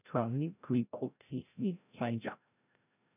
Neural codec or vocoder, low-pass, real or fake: codec, 16 kHz, 0.5 kbps, FreqCodec, larger model; 3.6 kHz; fake